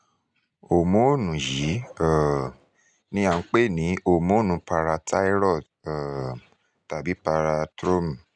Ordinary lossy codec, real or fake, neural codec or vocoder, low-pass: none; real; none; 9.9 kHz